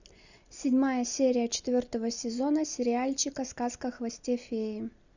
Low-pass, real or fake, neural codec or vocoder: 7.2 kHz; real; none